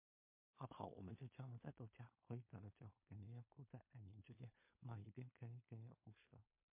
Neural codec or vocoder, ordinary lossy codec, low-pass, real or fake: codec, 16 kHz in and 24 kHz out, 0.4 kbps, LongCat-Audio-Codec, two codebook decoder; MP3, 24 kbps; 3.6 kHz; fake